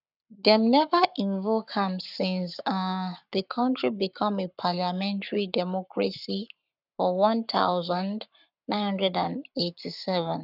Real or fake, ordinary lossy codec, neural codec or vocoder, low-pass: fake; none; codec, 44.1 kHz, 7.8 kbps, Pupu-Codec; 5.4 kHz